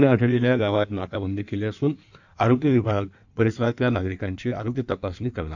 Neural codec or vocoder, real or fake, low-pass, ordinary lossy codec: codec, 16 kHz in and 24 kHz out, 1.1 kbps, FireRedTTS-2 codec; fake; 7.2 kHz; none